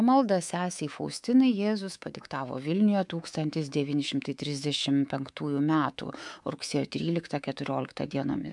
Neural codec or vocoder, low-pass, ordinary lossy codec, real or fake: codec, 24 kHz, 3.1 kbps, DualCodec; 10.8 kHz; MP3, 96 kbps; fake